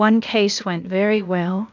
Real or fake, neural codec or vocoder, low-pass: fake; codec, 16 kHz, 0.8 kbps, ZipCodec; 7.2 kHz